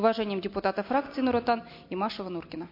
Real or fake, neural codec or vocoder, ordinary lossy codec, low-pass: real; none; MP3, 32 kbps; 5.4 kHz